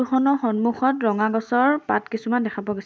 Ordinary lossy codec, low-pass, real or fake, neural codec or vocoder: none; none; real; none